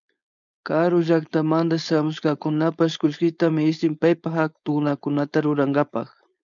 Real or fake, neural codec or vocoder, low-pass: fake; codec, 16 kHz, 4.8 kbps, FACodec; 7.2 kHz